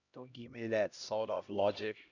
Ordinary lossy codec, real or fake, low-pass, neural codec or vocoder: none; fake; 7.2 kHz; codec, 16 kHz, 1 kbps, X-Codec, HuBERT features, trained on LibriSpeech